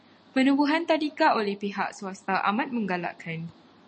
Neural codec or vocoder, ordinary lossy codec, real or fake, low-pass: none; MP3, 32 kbps; real; 10.8 kHz